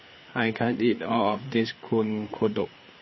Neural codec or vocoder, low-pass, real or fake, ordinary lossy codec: codec, 16 kHz, 4 kbps, FreqCodec, larger model; 7.2 kHz; fake; MP3, 24 kbps